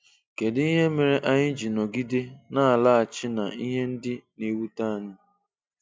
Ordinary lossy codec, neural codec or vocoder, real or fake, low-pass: none; none; real; none